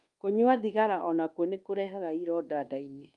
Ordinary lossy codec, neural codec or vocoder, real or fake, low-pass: Opus, 32 kbps; codec, 24 kHz, 1.2 kbps, DualCodec; fake; 10.8 kHz